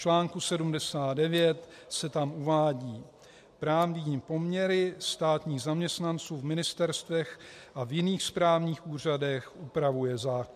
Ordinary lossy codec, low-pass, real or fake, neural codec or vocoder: MP3, 64 kbps; 14.4 kHz; real; none